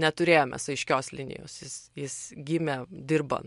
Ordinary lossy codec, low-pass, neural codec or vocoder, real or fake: MP3, 64 kbps; 10.8 kHz; none; real